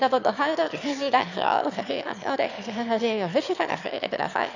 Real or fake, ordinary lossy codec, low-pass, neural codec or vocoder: fake; none; 7.2 kHz; autoencoder, 22.05 kHz, a latent of 192 numbers a frame, VITS, trained on one speaker